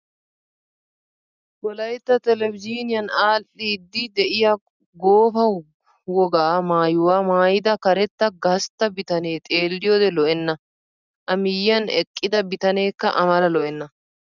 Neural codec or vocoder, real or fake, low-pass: none; real; 7.2 kHz